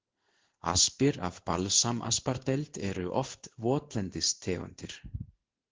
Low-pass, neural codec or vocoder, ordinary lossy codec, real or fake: 7.2 kHz; codec, 16 kHz in and 24 kHz out, 1 kbps, XY-Tokenizer; Opus, 16 kbps; fake